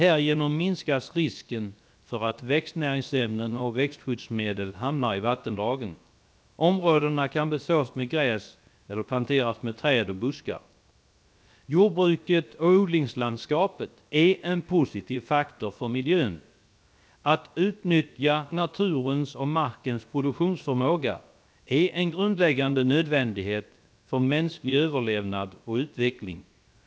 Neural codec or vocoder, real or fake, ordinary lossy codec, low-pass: codec, 16 kHz, 0.7 kbps, FocalCodec; fake; none; none